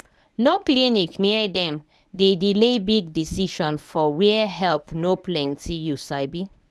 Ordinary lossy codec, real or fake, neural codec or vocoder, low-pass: none; fake; codec, 24 kHz, 0.9 kbps, WavTokenizer, medium speech release version 1; none